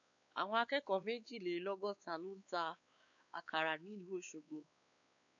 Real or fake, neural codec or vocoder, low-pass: fake; codec, 16 kHz, 4 kbps, X-Codec, WavLM features, trained on Multilingual LibriSpeech; 7.2 kHz